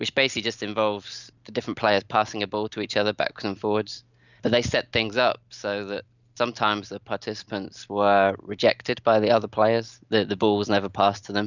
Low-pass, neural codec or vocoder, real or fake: 7.2 kHz; none; real